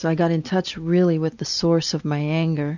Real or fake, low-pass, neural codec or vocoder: real; 7.2 kHz; none